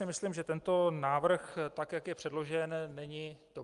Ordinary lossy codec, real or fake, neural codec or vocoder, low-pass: Opus, 32 kbps; real; none; 10.8 kHz